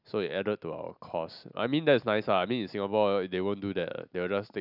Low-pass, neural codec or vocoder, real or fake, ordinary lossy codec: 5.4 kHz; none; real; none